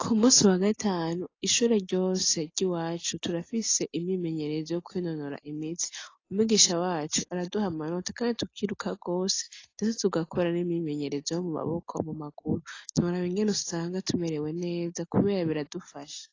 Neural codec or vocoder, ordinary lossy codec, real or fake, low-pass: none; AAC, 32 kbps; real; 7.2 kHz